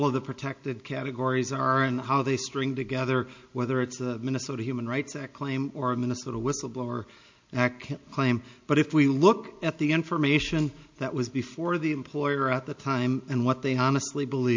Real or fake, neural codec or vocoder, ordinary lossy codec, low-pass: real; none; MP3, 48 kbps; 7.2 kHz